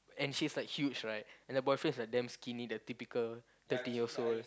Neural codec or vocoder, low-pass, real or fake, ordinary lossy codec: none; none; real; none